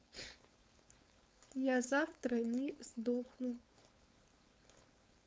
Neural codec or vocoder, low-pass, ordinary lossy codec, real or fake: codec, 16 kHz, 4.8 kbps, FACodec; none; none; fake